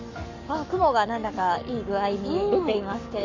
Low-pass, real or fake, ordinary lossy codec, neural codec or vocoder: 7.2 kHz; fake; none; codec, 44.1 kHz, 7.8 kbps, Pupu-Codec